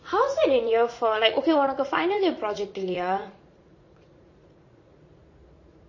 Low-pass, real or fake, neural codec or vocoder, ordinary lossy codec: 7.2 kHz; fake; vocoder, 44.1 kHz, 80 mel bands, Vocos; MP3, 32 kbps